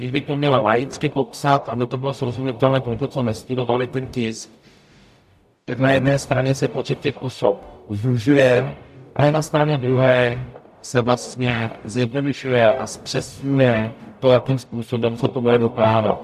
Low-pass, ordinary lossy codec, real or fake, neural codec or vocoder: 14.4 kHz; AAC, 96 kbps; fake; codec, 44.1 kHz, 0.9 kbps, DAC